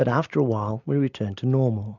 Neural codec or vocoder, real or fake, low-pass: none; real; 7.2 kHz